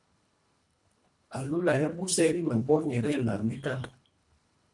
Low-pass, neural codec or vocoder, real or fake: 10.8 kHz; codec, 24 kHz, 1.5 kbps, HILCodec; fake